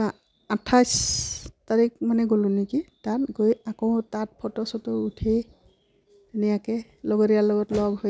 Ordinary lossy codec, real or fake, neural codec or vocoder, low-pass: none; real; none; none